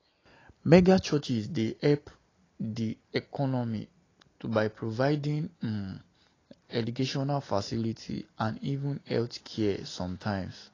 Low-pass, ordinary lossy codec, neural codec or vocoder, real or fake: 7.2 kHz; AAC, 32 kbps; none; real